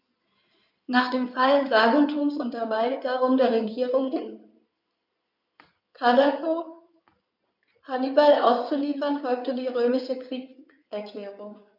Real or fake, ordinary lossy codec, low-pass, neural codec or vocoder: fake; none; 5.4 kHz; codec, 16 kHz in and 24 kHz out, 2.2 kbps, FireRedTTS-2 codec